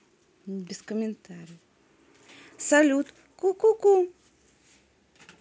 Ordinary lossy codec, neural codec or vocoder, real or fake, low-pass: none; none; real; none